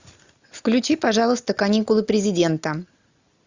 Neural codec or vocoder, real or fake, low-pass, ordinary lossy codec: none; real; 7.2 kHz; Opus, 64 kbps